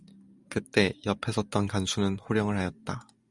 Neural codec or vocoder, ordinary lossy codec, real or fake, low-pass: none; Opus, 64 kbps; real; 10.8 kHz